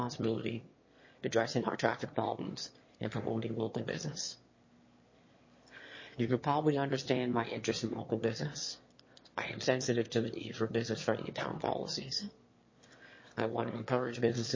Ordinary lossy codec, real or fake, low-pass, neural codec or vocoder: MP3, 32 kbps; fake; 7.2 kHz; autoencoder, 22.05 kHz, a latent of 192 numbers a frame, VITS, trained on one speaker